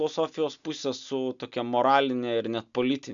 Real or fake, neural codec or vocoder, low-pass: real; none; 7.2 kHz